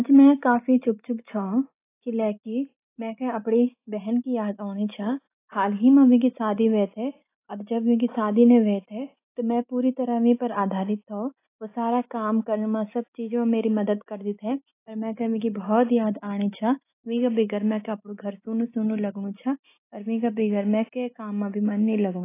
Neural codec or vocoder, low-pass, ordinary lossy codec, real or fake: none; 3.6 kHz; AAC, 24 kbps; real